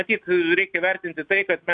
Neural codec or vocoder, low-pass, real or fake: none; 9.9 kHz; real